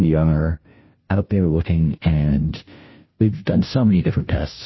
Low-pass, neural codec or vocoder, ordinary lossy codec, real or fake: 7.2 kHz; codec, 16 kHz, 0.5 kbps, FunCodec, trained on Chinese and English, 25 frames a second; MP3, 24 kbps; fake